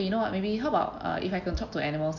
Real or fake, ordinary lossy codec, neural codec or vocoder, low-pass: real; MP3, 48 kbps; none; 7.2 kHz